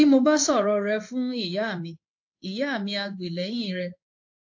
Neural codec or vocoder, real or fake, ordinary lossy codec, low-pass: codec, 16 kHz in and 24 kHz out, 1 kbps, XY-Tokenizer; fake; AAC, 48 kbps; 7.2 kHz